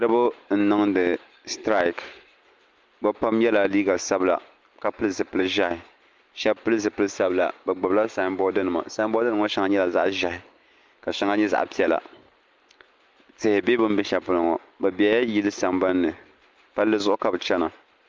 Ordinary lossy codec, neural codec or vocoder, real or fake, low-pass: Opus, 24 kbps; none; real; 7.2 kHz